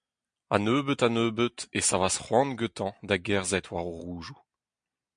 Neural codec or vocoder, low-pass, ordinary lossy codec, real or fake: none; 9.9 kHz; MP3, 64 kbps; real